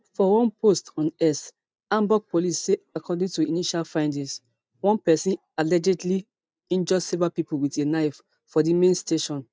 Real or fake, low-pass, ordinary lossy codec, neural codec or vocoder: real; none; none; none